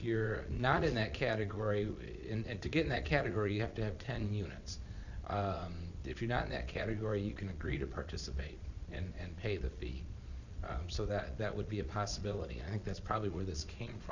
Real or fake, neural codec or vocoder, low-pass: fake; vocoder, 22.05 kHz, 80 mel bands, Vocos; 7.2 kHz